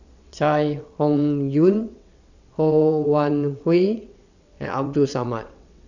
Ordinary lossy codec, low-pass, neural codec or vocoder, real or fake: none; 7.2 kHz; vocoder, 22.05 kHz, 80 mel bands, WaveNeXt; fake